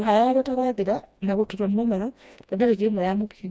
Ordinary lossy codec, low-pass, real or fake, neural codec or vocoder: none; none; fake; codec, 16 kHz, 1 kbps, FreqCodec, smaller model